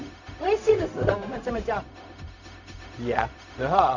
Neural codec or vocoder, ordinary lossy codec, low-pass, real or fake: codec, 16 kHz, 0.4 kbps, LongCat-Audio-Codec; none; 7.2 kHz; fake